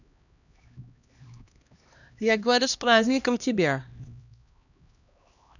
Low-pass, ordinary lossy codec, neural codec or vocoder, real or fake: 7.2 kHz; none; codec, 16 kHz, 1 kbps, X-Codec, HuBERT features, trained on LibriSpeech; fake